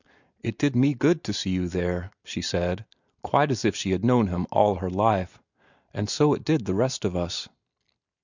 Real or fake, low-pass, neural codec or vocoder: real; 7.2 kHz; none